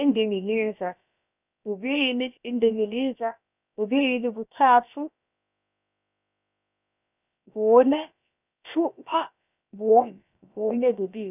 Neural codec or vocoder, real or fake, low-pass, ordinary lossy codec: codec, 16 kHz, about 1 kbps, DyCAST, with the encoder's durations; fake; 3.6 kHz; none